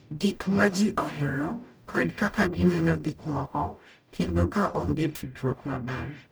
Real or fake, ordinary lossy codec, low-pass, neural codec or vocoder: fake; none; none; codec, 44.1 kHz, 0.9 kbps, DAC